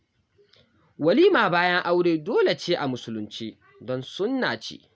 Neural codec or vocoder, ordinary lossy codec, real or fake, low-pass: none; none; real; none